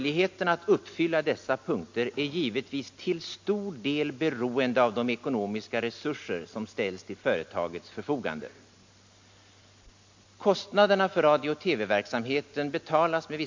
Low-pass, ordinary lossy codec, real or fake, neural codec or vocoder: 7.2 kHz; MP3, 48 kbps; real; none